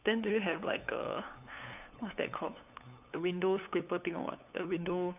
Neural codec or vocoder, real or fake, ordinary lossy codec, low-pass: codec, 16 kHz, 8 kbps, FunCodec, trained on LibriTTS, 25 frames a second; fake; none; 3.6 kHz